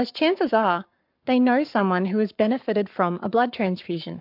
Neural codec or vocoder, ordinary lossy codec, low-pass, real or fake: codec, 44.1 kHz, 7.8 kbps, Pupu-Codec; MP3, 48 kbps; 5.4 kHz; fake